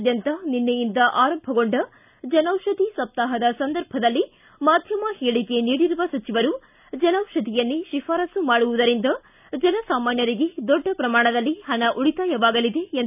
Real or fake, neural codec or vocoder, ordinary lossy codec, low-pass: real; none; none; 3.6 kHz